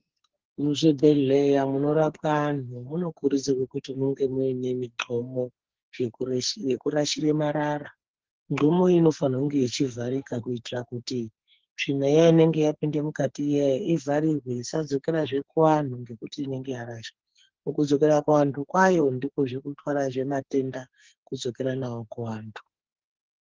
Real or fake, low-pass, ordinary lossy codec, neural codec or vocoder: fake; 7.2 kHz; Opus, 16 kbps; codec, 44.1 kHz, 2.6 kbps, SNAC